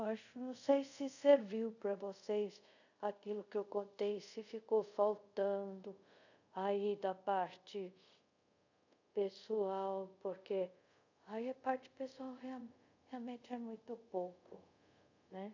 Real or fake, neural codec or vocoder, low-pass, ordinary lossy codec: fake; codec, 24 kHz, 0.5 kbps, DualCodec; 7.2 kHz; none